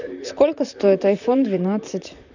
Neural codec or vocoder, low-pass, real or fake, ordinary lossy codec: vocoder, 44.1 kHz, 128 mel bands, Pupu-Vocoder; 7.2 kHz; fake; none